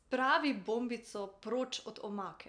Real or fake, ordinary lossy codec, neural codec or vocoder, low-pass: real; none; none; 9.9 kHz